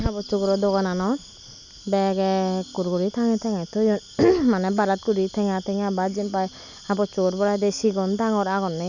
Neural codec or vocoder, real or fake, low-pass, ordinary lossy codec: none; real; 7.2 kHz; none